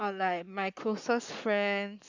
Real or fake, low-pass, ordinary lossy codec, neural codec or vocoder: fake; 7.2 kHz; none; codec, 44.1 kHz, 7.8 kbps, Pupu-Codec